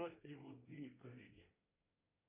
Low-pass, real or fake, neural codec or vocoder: 3.6 kHz; fake; codec, 24 kHz, 1 kbps, SNAC